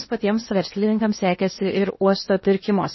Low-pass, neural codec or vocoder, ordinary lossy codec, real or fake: 7.2 kHz; codec, 16 kHz in and 24 kHz out, 0.8 kbps, FocalCodec, streaming, 65536 codes; MP3, 24 kbps; fake